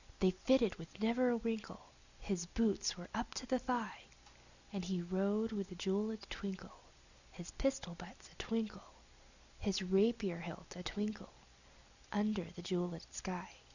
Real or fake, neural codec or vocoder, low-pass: real; none; 7.2 kHz